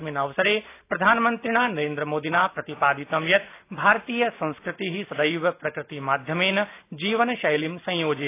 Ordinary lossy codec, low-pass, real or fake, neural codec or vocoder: AAC, 24 kbps; 3.6 kHz; real; none